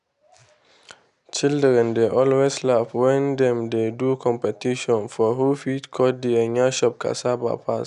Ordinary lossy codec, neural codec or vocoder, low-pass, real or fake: none; none; 10.8 kHz; real